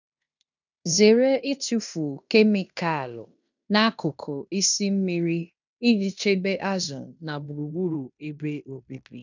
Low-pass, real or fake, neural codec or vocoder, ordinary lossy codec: 7.2 kHz; fake; codec, 16 kHz in and 24 kHz out, 0.9 kbps, LongCat-Audio-Codec, fine tuned four codebook decoder; none